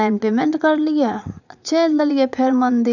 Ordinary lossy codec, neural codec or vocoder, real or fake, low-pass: none; vocoder, 44.1 kHz, 80 mel bands, Vocos; fake; 7.2 kHz